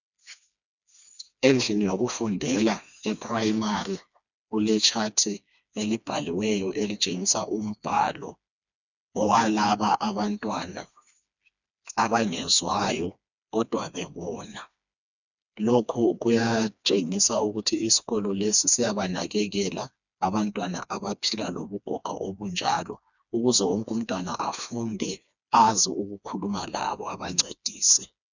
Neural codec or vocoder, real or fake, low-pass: codec, 16 kHz, 2 kbps, FreqCodec, smaller model; fake; 7.2 kHz